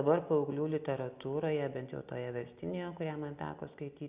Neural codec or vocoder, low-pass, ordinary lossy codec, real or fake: none; 3.6 kHz; Opus, 64 kbps; real